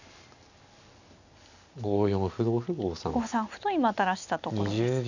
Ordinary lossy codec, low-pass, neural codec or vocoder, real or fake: none; 7.2 kHz; none; real